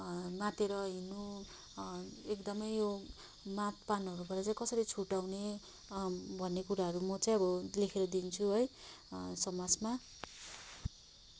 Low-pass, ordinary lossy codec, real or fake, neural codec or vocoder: none; none; real; none